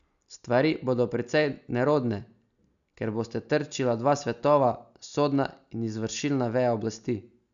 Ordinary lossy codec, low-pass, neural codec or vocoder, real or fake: none; 7.2 kHz; none; real